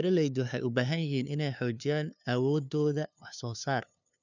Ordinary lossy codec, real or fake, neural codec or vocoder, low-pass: none; fake; codec, 16 kHz, 2 kbps, FunCodec, trained on LibriTTS, 25 frames a second; 7.2 kHz